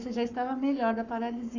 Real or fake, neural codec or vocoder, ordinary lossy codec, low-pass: fake; codec, 44.1 kHz, 7.8 kbps, Pupu-Codec; none; 7.2 kHz